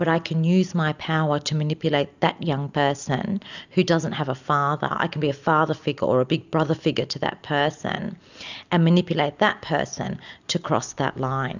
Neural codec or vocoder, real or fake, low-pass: none; real; 7.2 kHz